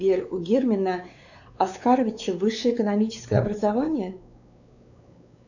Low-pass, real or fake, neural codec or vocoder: 7.2 kHz; fake; codec, 16 kHz, 4 kbps, X-Codec, WavLM features, trained on Multilingual LibriSpeech